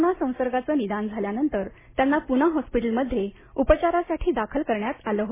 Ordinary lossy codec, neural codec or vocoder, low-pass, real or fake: MP3, 16 kbps; none; 3.6 kHz; real